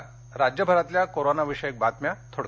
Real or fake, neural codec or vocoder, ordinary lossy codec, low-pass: real; none; none; none